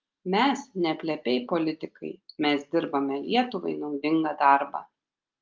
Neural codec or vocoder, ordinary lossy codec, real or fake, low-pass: none; Opus, 32 kbps; real; 7.2 kHz